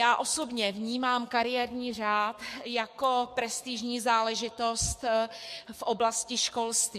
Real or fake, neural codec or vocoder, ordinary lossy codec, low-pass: fake; codec, 44.1 kHz, 7.8 kbps, DAC; MP3, 64 kbps; 14.4 kHz